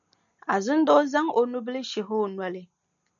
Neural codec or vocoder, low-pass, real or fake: none; 7.2 kHz; real